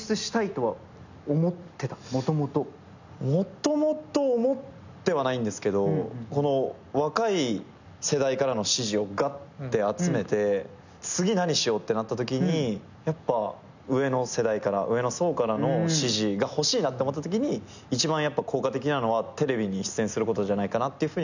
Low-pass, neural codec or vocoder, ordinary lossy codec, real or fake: 7.2 kHz; none; none; real